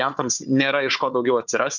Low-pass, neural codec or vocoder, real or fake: 7.2 kHz; codec, 16 kHz, 4 kbps, FunCodec, trained on Chinese and English, 50 frames a second; fake